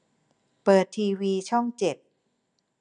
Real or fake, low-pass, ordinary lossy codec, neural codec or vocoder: real; 9.9 kHz; none; none